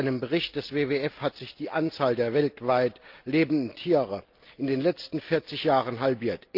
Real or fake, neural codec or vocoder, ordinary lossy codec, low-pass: real; none; Opus, 24 kbps; 5.4 kHz